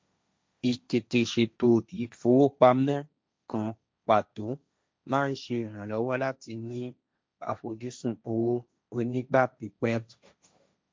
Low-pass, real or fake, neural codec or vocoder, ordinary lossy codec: none; fake; codec, 16 kHz, 1.1 kbps, Voila-Tokenizer; none